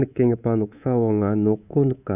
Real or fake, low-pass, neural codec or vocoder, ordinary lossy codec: fake; 3.6 kHz; codec, 24 kHz, 3.1 kbps, DualCodec; none